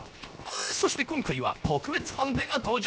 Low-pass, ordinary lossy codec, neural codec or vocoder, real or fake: none; none; codec, 16 kHz, 0.7 kbps, FocalCodec; fake